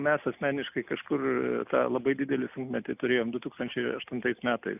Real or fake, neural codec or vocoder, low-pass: real; none; 3.6 kHz